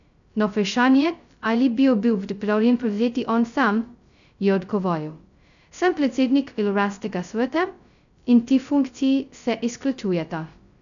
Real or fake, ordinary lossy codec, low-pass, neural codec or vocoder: fake; none; 7.2 kHz; codec, 16 kHz, 0.2 kbps, FocalCodec